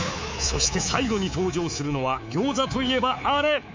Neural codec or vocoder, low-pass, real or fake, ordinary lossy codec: codec, 24 kHz, 3.1 kbps, DualCodec; 7.2 kHz; fake; MP3, 64 kbps